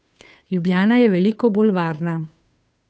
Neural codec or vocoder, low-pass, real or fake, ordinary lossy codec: codec, 16 kHz, 2 kbps, FunCodec, trained on Chinese and English, 25 frames a second; none; fake; none